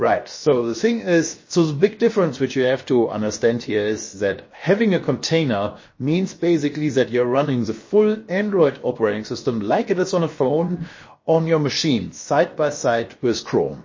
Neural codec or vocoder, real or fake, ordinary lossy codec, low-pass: codec, 16 kHz, 0.7 kbps, FocalCodec; fake; MP3, 32 kbps; 7.2 kHz